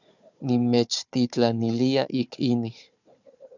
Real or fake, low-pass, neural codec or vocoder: fake; 7.2 kHz; codec, 16 kHz, 4 kbps, FunCodec, trained on Chinese and English, 50 frames a second